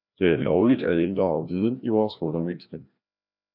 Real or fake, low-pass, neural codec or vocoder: fake; 5.4 kHz; codec, 16 kHz, 1 kbps, FreqCodec, larger model